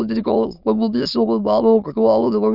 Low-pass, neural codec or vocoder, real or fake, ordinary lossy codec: 5.4 kHz; autoencoder, 22.05 kHz, a latent of 192 numbers a frame, VITS, trained on many speakers; fake; none